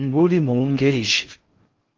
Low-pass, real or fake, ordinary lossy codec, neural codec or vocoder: 7.2 kHz; fake; Opus, 24 kbps; codec, 16 kHz in and 24 kHz out, 0.6 kbps, FocalCodec, streaming, 4096 codes